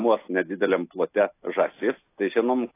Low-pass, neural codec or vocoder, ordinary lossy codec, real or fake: 3.6 kHz; none; AAC, 24 kbps; real